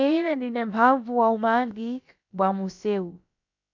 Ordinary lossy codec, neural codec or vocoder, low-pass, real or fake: MP3, 64 kbps; codec, 16 kHz, about 1 kbps, DyCAST, with the encoder's durations; 7.2 kHz; fake